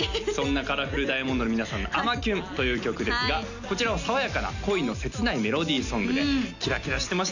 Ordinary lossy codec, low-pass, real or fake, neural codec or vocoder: none; 7.2 kHz; real; none